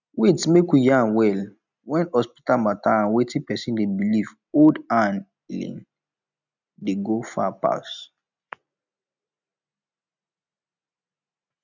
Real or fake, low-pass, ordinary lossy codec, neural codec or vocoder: real; 7.2 kHz; none; none